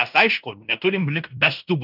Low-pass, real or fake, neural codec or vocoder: 5.4 kHz; fake; codec, 16 kHz in and 24 kHz out, 0.9 kbps, LongCat-Audio-Codec, fine tuned four codebook decoder